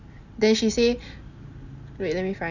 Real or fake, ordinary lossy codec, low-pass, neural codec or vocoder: real; none; 7.2 kHz; none